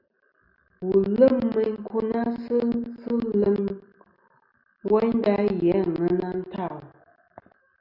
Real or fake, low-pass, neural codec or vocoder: real; 5.4 kHz; none